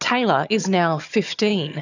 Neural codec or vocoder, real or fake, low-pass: vocoder, 22.05 kHz, 80 mel bands, HiFi-GAN; fake; 7.2 kHz